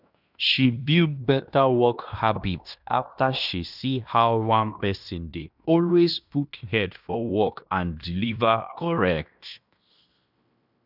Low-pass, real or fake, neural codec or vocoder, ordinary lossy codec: 5.4 kHz; fake; codec, 16 kHz in and 24 kHz out, 0.9 kbps, LongCat-Audio-Codec, fine tuned four codebook decoder; none